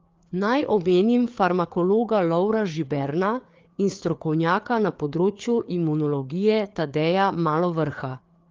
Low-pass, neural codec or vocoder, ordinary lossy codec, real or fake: 7.2 kHz; codec, 16 kHz, 4 kbps, FreqCodec, larger model; Opus, 32 kbps; fake